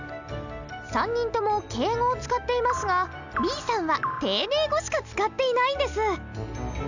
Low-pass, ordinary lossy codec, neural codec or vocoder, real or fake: 7.2 kHz; none; none; real